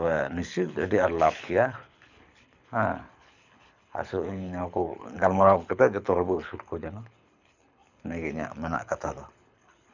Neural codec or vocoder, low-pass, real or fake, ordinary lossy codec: codec, 24 kHz, 6 kbps, HILCodec; 7.2 kHz; fake; none